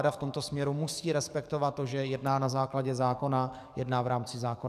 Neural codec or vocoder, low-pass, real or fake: codec, 44.1 kHz, 7.8 kbps, DAC; 14.4 kHz; fake